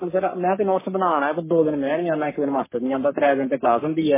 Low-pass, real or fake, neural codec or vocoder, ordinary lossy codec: 3.6 kHz; fake; codec, 44.1 kHz, 3.4 kbps, Pupu-Codec; MP3, 16 kbps